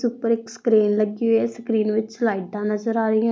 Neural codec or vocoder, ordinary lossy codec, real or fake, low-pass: none; none; real; none